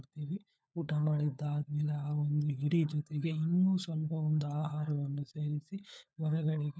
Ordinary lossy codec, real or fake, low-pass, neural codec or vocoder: none; fake; none; codec, 16 kHz, 4 kbps, FunCodec, trained on LibriTTS, 50 frames a second